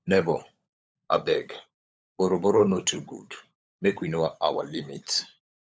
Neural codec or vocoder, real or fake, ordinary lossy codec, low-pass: codec, 16 kHz, 16 kbps, FunCodec, trained on LibriTTS, 50 frames a second; fake; none; none